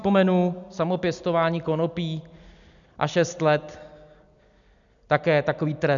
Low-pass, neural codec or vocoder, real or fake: 7.2 kHz; none; real